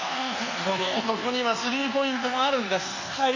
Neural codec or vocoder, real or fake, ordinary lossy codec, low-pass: codec, 24 kHz, 1.2 kbps, DualCodec; fake; AAC, 48 kbps; 7.2 kHz